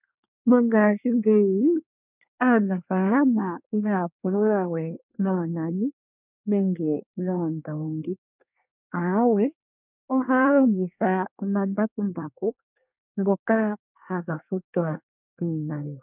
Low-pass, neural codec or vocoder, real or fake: 3.6 kHz; codec, 24 kHz, 1 kbps, SNAC; fake